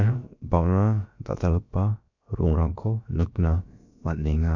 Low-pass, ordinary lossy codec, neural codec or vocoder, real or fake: 7.2 kHz; none; codec, 16 kHz, about 1 kbps, DyCAST, with the encoder's durations; fake